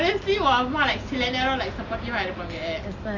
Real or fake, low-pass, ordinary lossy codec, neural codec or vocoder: real; 7.2 kHz; none; none